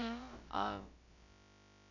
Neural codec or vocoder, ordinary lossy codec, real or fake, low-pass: codec, 16 kHz, about 1 kbps, DyCAST, with the encoder's durations; none; fake; 7.2 kHz